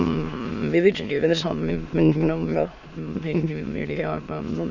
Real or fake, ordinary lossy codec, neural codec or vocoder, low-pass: fake; none; autoencoder, 22.05 kHz, a latent of 192 numbers a frame, VITS, trained on many speakers; 7.2 kHz